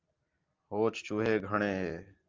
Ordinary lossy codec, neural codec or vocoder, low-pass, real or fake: Opus, 24 kbps; none; 7.2 kHz; real